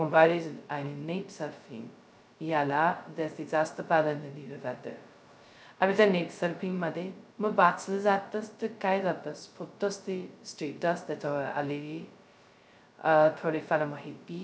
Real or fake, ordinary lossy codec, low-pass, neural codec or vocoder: fake; none; none; codec, 16 kHz, 0.2 kbps, FocalCodec